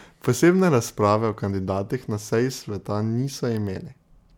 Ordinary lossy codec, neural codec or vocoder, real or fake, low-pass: MP3, 96 kbps; none; real; 19.8 kHz